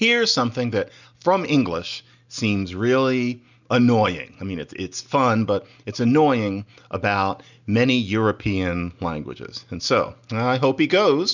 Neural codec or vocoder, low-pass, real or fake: none; 7.2 kHz; real